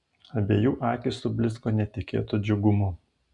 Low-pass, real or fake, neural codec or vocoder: 10.8 kHz; real; none